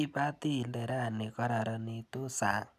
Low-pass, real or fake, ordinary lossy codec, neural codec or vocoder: 14.4 kHz; real; none; none